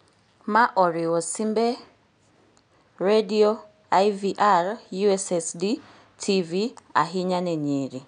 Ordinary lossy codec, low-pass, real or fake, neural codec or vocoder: none; 9.9 kHz; real; none